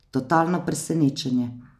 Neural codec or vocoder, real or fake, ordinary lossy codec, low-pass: none; real; none; 14.4 kHz